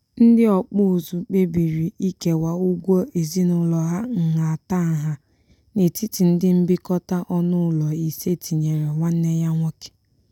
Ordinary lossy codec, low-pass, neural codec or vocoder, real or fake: none; 19.8 kHz; none; real